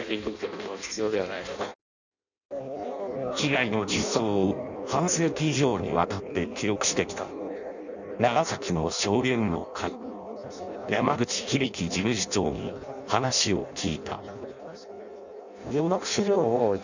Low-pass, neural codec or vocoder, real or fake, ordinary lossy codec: 7.2 kHz; codec, 16 kHz in and 24 kHz out, 0.6 kbps, FireRedTTS-2 codec; fake; none